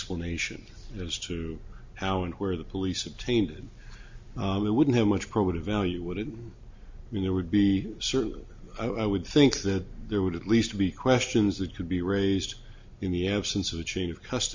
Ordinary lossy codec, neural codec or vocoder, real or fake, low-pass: MP3, 48 kbps; none; real; 7.2 kHz